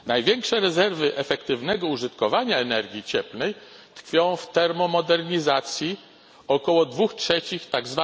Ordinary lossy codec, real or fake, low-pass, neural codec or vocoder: none; real; none; none